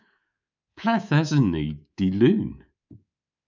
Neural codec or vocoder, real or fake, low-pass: codec, 24 kHz, 3.1 kbps, DualCodec; fake; 7.2 kHz